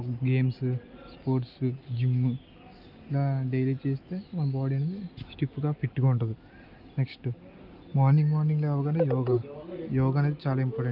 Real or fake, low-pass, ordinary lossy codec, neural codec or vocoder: real; 5.4 kHz; Opus, 24 kbps; none